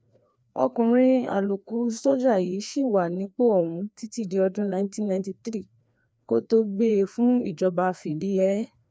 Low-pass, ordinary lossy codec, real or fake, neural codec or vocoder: none; none; fake; codec, 16 kHz, 2 kbps, FreqCodec, larger model